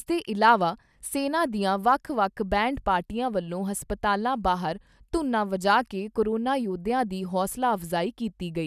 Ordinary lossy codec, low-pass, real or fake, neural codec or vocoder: none; 14.4 kHz; real; none